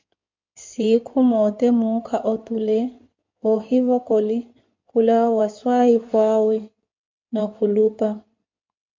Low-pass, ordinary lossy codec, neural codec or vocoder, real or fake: 7.2 kHz; MP3, 48 kbps; codec, 16 kHz in and 24 kHz out, 2.2 kbps, FireRedTTS-2 codec; fake